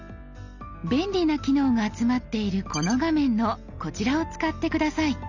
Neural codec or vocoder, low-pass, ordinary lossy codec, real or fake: none; 7.2 kHz; none; real